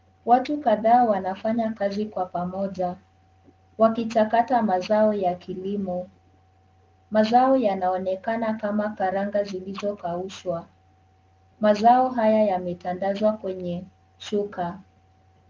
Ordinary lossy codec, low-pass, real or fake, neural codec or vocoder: Opus, 32 kbps; 7.2 kHz; real; none